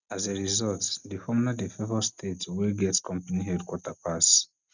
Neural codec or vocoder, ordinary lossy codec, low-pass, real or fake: none; none; 7.2 kHz; real